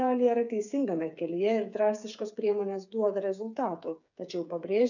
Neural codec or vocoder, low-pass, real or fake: codec, 16 kHz, 8 kbps, FreqCodec, smaller model; 7.2 kHz; fake